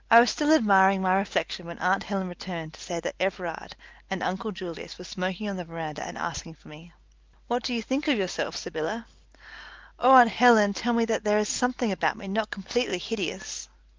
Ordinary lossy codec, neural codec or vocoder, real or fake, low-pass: Opus, 32 kbps; none; real; 7.2 kHz